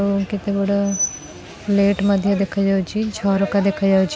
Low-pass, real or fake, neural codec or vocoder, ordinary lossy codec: none; real; none; none